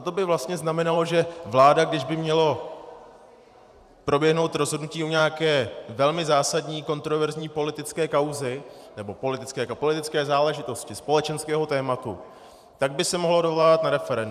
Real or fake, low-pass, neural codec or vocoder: fake; 14.4 kHz; vocoder, 44.1 kHz, 128 mel bands every 512 samples, BigVGAN v2